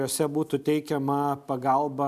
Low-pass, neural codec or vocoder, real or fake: 14.4 kHz; none; real